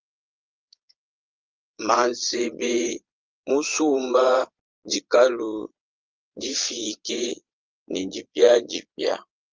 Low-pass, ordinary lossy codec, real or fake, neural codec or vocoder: 7.2 kHz; Opus, 32 kbps; fake; vocoder, 22.05 kHz, 80 mel bands, Vocos